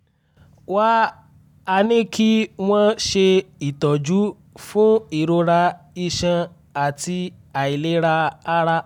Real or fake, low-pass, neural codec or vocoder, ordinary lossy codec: real; 19.8 kHz; none; none